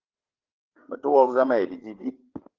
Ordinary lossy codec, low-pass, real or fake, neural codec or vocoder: Opus, 16 kbps; 7.2 kHz; fake; codec, 16 kHz, 8 kbps, FreqCodec, larger model